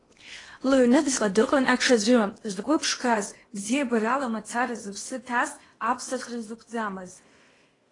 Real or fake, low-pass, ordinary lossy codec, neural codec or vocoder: fake; 10.8 kHz; AAC, 32 kbps; codec, 16 kHz in and 24 kHz out, 0.8 kbps, FocalCodec, streaming, 65536 codes